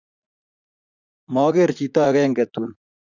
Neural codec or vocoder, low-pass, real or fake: vocoder, 44.1 kHz, 80 mel bands, Vocos; 7.2 kHz; fake